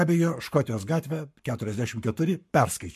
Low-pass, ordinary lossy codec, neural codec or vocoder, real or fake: 14.4 kHz; MP3, 64 kbps; codec, 44.1 kHz, 7.8 kbps, Pupu-Codec; fake